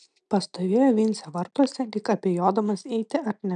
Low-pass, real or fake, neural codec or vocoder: 9.9 kHz; real; none